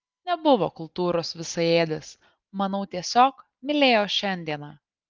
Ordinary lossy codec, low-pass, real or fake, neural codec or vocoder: Opus, 32 kbps; 7.2 kHz; real; none